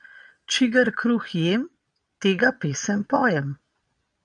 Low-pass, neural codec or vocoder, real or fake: 9.9 kHz; vocoder, 22.05 kHz, 80 mel bands, Vocos; fake